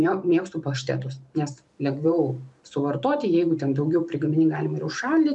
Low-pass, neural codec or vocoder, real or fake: 10.8 kHz; vocoder, 44.1 kHz, 128 mel bands every 512 samples, BigVGAN v2; fake